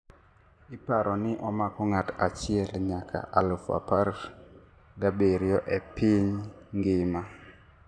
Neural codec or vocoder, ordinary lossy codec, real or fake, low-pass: none; none; real; none